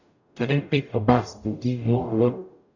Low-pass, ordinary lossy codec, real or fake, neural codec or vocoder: 7.2 kHz; none; fake; codec, 44.1 kHz, 0.9 kbps, DAC